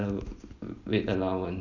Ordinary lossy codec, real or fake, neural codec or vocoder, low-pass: none; real; none; 7.2 kHz